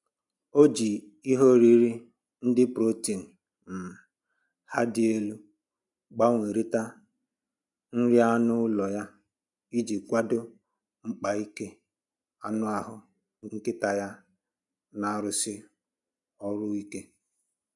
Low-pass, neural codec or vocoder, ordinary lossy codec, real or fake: 10.8 kHz; none; none; real